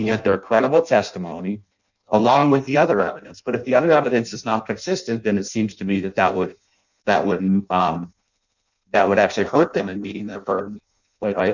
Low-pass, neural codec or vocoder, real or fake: 7.2 kHz; codec, 16 kHz in and 24 kHz out, 0.6 kbps, FireRedTTS-2 codec; fake